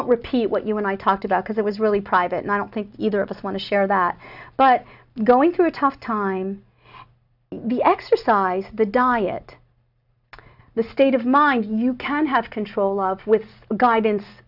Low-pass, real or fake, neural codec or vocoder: 5.4 kHz; real; none